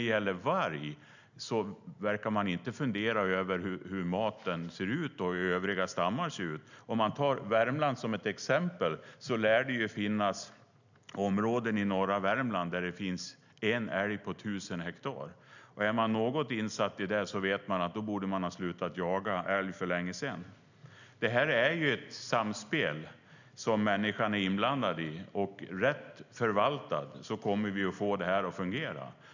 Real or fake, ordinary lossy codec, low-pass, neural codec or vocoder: real; none; 7.2 kHz; none